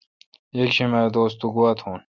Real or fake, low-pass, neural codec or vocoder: real; 7.2 kHz; none